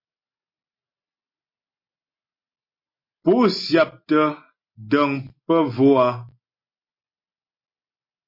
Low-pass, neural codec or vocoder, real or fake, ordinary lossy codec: 5.4 kHz; none; real; MP3, 32 kbps